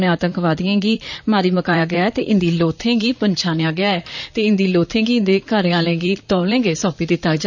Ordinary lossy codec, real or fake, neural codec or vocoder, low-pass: none; fake; vocoder, 44.1 kHz, 128 mel bands, Pupu-Vocoder; 7.2 kHz